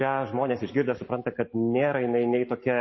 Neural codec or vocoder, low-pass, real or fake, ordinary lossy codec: none; 7.2 kHz; real; MP3, 24 kbps